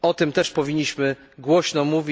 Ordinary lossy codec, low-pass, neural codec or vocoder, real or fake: none; none; none; real